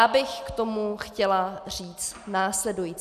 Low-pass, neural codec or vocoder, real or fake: 14.4 kHz; none; real